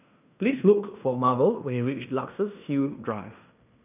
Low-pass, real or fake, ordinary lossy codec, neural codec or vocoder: 3.6 kHz; fake; none; codec, 16 kHz in and 24 kHz out, 0.9 kbps, LongCat-Audio-Codec, fine tuned four codebook decoder